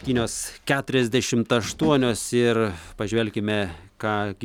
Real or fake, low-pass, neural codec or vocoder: real; 19.8 kHz; none